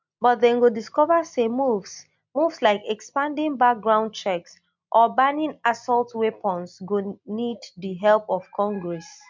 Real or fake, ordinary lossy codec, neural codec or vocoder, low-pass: real; MP3, 64 kbps; none; 7.2 kHz